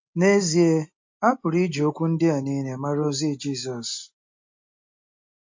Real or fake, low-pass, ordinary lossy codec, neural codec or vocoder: real; 7.2 kHz; MP3, 48 kbps; none